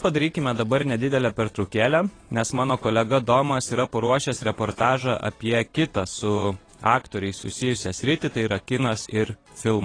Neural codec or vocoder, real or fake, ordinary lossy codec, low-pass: vocoder, 22.05 kHz, 80 mel bands, WaveNeXt; fake; AAC, 32 kbps; 9.9 kHz